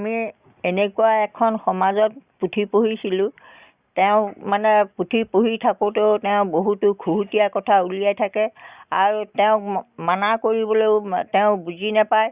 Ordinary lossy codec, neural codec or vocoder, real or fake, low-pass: Opus, 64 kbps; none; real; 3.6 kHz